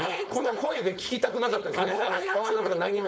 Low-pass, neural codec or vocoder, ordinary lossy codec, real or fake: none; codec, 16 kHz, 4.8 kbps, FACodec; none; fake